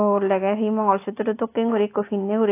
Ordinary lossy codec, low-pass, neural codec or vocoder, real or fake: AAC, 24 kbps; 3.6 kHz; none; real